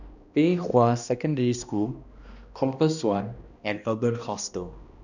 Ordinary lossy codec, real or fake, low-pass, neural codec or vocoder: none; fake; 7.2 kHz; codec, 16 kHz, 1 kbps, X-Codec, HuBERT features, trained on balanced general audio